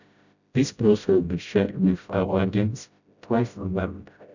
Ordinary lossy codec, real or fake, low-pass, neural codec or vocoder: none; fake; 7.2 kHz; codec, 16 kHz, 0.5 kbps, FreqCodec, smaller model